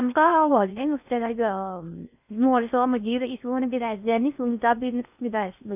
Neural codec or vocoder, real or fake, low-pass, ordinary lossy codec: codec, 16 kHz in and 24 kHz out, 0.6 kbps, FocalCodec, streaming, 4096 codes; fake; 3.6 kHz; none